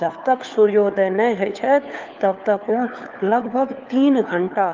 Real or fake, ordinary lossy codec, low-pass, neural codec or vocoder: fake; Opus, 24 kbps; 7.2 kHz; codec, 16 kHz, 4 kbps, FunCodec, trained on LibriTTS, 50 frames a second